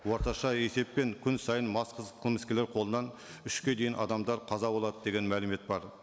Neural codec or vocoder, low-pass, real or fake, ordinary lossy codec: none; none; real; none